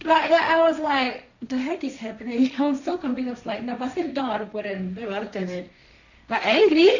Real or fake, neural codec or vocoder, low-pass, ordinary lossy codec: fake; codec, 16 kHz, 1.1 kbps, Voila-Tokenizer; 7.2 kHz; none